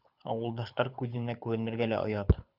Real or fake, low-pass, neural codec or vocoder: fake; 5.4 kHz; codec, 24 kHz, 6 kbps, HILCodec